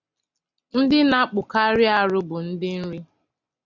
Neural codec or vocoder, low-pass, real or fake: none; 7.2 kHz; real